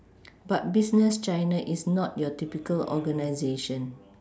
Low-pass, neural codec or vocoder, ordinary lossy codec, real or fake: none; none; none; real